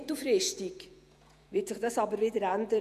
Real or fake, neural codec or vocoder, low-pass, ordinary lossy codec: fake; vocoder, 48 kHz, 128 mel bands, Vocos; 14.4 kHz; none